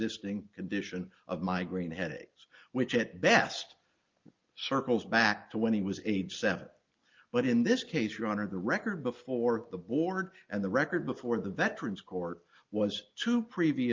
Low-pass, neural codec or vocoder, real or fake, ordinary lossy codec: 7.2 kHz; none; real; Opus, 24 kbps